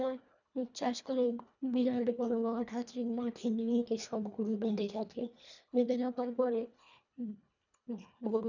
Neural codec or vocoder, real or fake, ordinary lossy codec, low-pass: codec, 24 kHz, 1.5 kbps, HILCodec; fake; none; 7.2 kHz